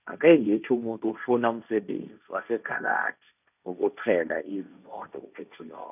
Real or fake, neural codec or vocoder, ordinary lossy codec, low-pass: fake; codec, 16 kHz, 1.1 kbps, Voila-Tokenizer; none; 3.6 kHz